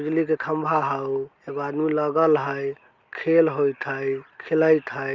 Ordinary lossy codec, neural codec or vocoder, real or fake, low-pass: Opus, 24 kbps; none; real; 7.2 kHz